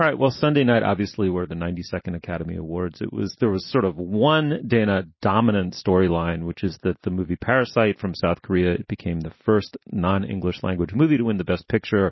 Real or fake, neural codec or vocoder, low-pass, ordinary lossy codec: real; none; 7.2 kHz; MP3, 24 kbps